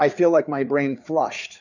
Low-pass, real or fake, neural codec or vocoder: 7.2 kHz; fake; codec, 16 kHz, 4 kbps, FreqCodec, larger model